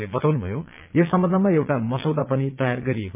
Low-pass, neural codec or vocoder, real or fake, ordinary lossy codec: 3.6 kHz; vocoder, 22.05 kHz, 80 mel bands, Vocos; fake; MP3, 32 kbps